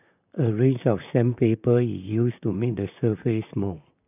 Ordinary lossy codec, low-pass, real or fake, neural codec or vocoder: none; 3.6 kHz; fake; vocoder, 44.1 kHz, 128 mel bands, Pupu-Vocoder